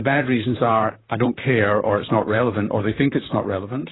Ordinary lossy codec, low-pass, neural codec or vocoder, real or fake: AAC, 16 kbps; 7.2 kHz; vocoder, 44.1 kHz, 128 mel bands, Pupu-Vocoder; fake